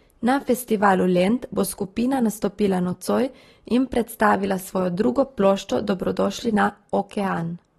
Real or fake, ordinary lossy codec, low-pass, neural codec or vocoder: real; AAC, 32 kbps; 19.8 kHz; none